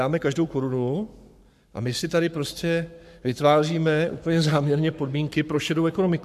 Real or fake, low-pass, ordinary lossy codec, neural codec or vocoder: fake; 14.4 kHz; MP3, 96 kbps; codec, 44.1 kHz, 7.8 kbps, Pupu-Codec